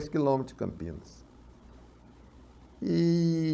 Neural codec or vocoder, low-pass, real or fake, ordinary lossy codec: codec, 16 kHz, 16 kbps, FunCodec, trained on Chinese and English, 50 frames a second; none; fake; none